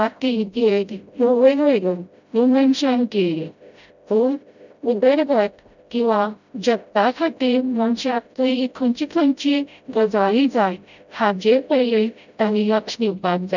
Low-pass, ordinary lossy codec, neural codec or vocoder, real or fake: 7.2 kHz; none; codec, 16 kHz, 0.5 kbps, FreqCodec, smaller model; fake